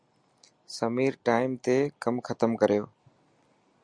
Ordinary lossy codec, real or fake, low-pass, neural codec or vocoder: Opus, 64 kbps; real; 9.9 kHz; none